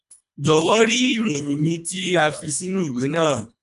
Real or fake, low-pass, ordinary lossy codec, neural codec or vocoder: fake; 10.8 kHz; none; codec, 24 kHz, 1.5 kbps, HILCodec